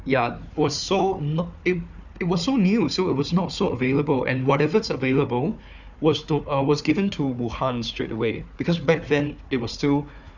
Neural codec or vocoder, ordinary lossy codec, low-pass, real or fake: codec, 16 kHz, 4 kbps, FunCodec, trained on Chinese and English, 50 frames a second; none; 7.2 kHz; fake